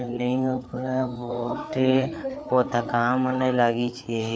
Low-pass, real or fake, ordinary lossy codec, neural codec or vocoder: none; fake; none; codec, 16 kHz, 4 kbps, FreqCodec, larger model